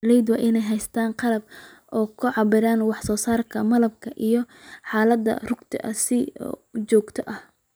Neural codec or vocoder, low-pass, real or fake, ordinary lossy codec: none; none; real; none